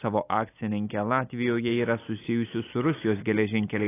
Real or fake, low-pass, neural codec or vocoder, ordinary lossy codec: real; 3.6 kHz; none; AAC, 16 kbps